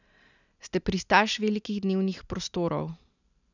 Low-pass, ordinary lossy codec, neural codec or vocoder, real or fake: 7.2 kHz; none; none; real